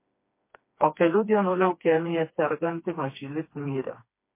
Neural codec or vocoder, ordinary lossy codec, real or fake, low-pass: codec, 16 kHz, 2 kbps, FreqCodec, smaller model; MP3, 24 kbps; fake; 3.6 kHz